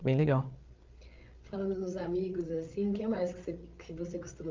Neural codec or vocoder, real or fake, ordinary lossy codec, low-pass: codec, 16 kHz, 8 kbps, FreqCodec, larger model; fake; Opus, 24 kbps; 7.2 kHz